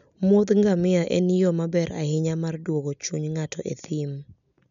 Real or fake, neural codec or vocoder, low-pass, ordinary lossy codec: real; none; 7.2 kHz; none